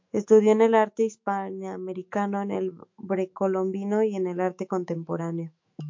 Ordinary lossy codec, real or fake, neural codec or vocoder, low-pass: MP3, 48 kbps; fake; autoencoder, 48 kHz, 128 numbers a frame, DAC-VAE, trained on Japanese speech; 7.2 kHz